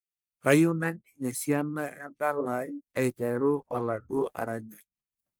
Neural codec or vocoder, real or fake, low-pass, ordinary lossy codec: codec, 44.1 kHz, 1.7 kbps, Pupu-Codec; fake; none; none